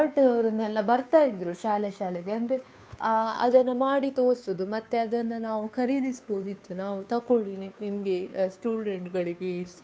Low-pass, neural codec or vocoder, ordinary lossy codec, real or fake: none; codec, 16 kHz, 2 kbps, FunCodec, trained on Chinese and English, 25 frames a second; none; fake